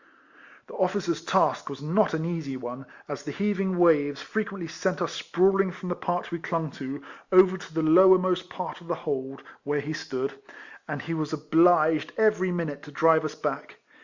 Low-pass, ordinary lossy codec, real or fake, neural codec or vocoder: 7.2 kHz; Opus, 64 kbps; real; none